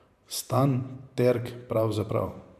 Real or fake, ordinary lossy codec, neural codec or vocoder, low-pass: fake; none; vocoder, 44.1 kHz, 128 mel bands every 512 samples, BigVGAN v2; 14.4 kHz